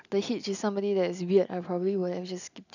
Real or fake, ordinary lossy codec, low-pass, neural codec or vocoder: real; none; 7.2 kHz; none